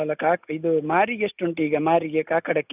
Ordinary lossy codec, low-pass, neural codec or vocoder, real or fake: none; 3.6 kHz; none; real